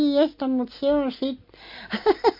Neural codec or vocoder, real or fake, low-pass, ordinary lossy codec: none; real; 5.4 kHz; MP3, 32 kbps